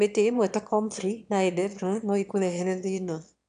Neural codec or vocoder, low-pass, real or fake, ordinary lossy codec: autoencoder, 22.05 kHz, a latent of 192 numbers a frame, VITS, trained on one speaker; 9.9 kHz; fake; none